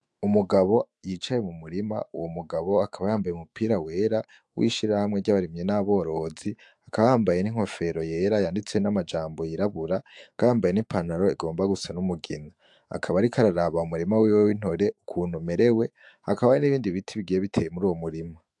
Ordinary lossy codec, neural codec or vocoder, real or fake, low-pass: AAC, 64 kbps; autoencoder, 48 kHz, 128 numbers a frame, DAC-VAE, trained on Japanese speech; fake; 10.8 kHz